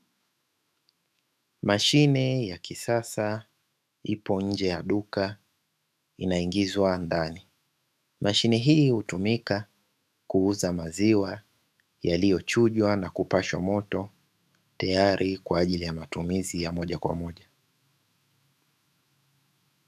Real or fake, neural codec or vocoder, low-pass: fake; autoencoder, 48 kHz, 128 numbers a frame, DAC-VAE, trained on Japanese speech; 14.4 kHz